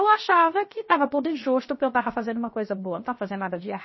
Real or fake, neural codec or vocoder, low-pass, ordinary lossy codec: fake; codec, 16 kHz, 0.7 kbps, FocalCodec; 7.2 kHz; MP3, 24 kbps